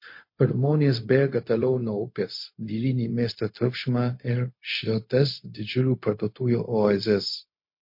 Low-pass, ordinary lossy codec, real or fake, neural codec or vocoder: 5.4 kHz; MP3, 32 kbps; fake; codec, 16 kHz, 0.4 kbps, LongCat-Audio-Codec